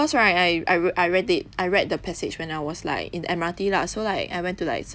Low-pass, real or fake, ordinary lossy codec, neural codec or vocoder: none; real; none; none